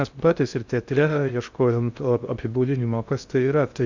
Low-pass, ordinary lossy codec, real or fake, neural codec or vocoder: 7.2 kHz; Opus, 64 kbps; fake; codec, 16 kHz in and 24 kHz out, 0.6 kbps, FocalCodec, streaming, 2048 codes